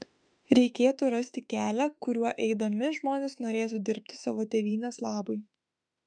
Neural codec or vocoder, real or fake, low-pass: autoencoder, 48 kHz, 32 numbers a frame, DAC-VAE, trained on Japanese speech; fake; 9.9 kHz